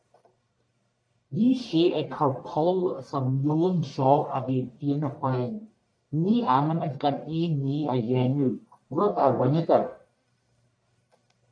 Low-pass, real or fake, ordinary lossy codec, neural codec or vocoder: 9.9 kHz; fake; MP3, 96 kbps; codec, 44.1 kHz, 1.7 kbps, Pupu-Codec